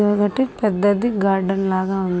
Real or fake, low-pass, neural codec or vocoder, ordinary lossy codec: real; none; none; none